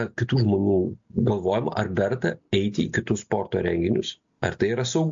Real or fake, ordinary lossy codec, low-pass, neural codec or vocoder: real; MP3, 48 kbps; 7.2 kHz; none